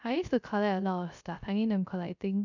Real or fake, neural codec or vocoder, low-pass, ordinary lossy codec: fake; codec, 16 kHz, 0.3 kbps, FocalCodec; 7.2 kHz; none